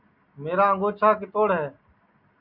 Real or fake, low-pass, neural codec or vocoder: real; 5.4 kHz; none